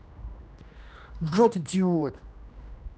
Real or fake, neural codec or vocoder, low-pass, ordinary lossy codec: fake; codec, 16 kHz, 1 kbps, X-Codec, HuBERT features, trained on general audio; none; none